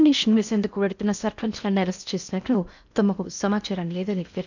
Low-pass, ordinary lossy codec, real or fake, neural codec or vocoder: 7.2 kHz; none; fake; codec, 16 kHz in and 24 kHz out, 0.6 kbps, FocalCodec, streaming, 4096 codes